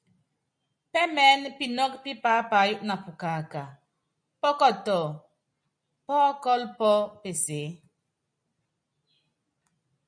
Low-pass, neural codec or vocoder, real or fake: 9.9 kHz; none; real